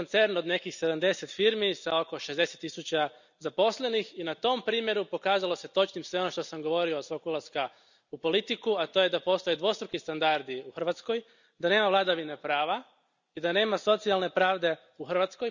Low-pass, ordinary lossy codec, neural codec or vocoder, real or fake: 7.2 kHz; none; none; real